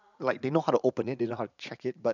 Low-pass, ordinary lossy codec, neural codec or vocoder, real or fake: 7.2 kHz; none; vocoder, 44.1 kHz, 80 mel bands, Vocos; fake